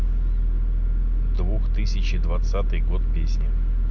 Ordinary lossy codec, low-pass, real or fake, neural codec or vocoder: none; 7.2 kHz; real; none